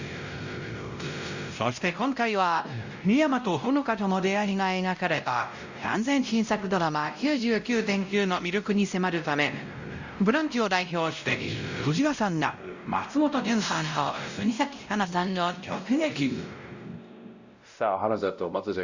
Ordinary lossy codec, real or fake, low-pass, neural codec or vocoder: Opus, 64 kbps; fake; 7.2 kHz; codec, 16 kHz, 0.5 kbps, X-Codec, WavLM features, trained on Multilingual LibriSpeech